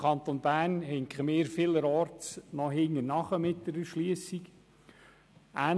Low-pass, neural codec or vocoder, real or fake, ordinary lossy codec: none; none; real; none